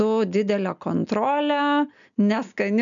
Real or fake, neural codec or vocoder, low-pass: real; none; 7.2 kHz